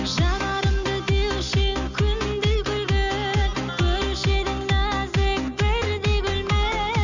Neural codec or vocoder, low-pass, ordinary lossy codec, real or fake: none; 7.2 kHz; none; real